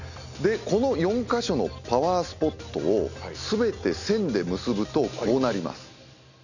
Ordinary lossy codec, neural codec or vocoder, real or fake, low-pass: none; none; real; 7.2 kHz